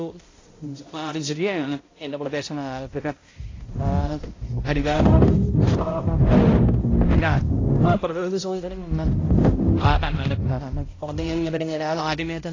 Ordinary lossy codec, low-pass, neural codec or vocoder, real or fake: AAC, 32 kbps; 7.2 kHz; codec, 16 kHz, 0.5 kbps, X-Codec, HuBERT features, trained on balanced general audio; fake